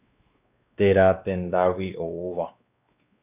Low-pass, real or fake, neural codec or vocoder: 3.6 kHz; fake; codec, 16 kHz, 2 kbps, X-Codec, WavLM features, trained on Multilingual LibriSpeech